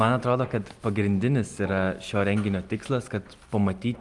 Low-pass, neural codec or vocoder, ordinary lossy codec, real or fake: 10.8 kHz; none; Opus, 32 kbps; real